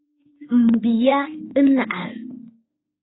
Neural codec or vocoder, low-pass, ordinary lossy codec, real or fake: autoencoder, 48 kHz, 32 numbers a frame, DAC-VAE, trained on Japanese speech; 7.2 kHz; AAC, 16 kbps; fake